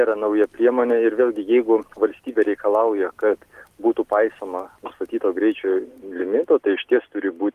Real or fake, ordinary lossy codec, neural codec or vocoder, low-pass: real; Opus, 24 kbps; none; 14.4 kHz